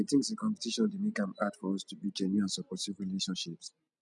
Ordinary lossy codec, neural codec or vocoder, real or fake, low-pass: none; none; real; 10.8 kHz